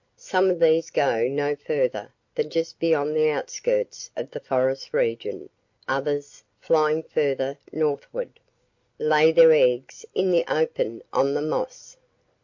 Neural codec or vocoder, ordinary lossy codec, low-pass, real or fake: none; MP3, 48 kbps; 7.2 kHz; real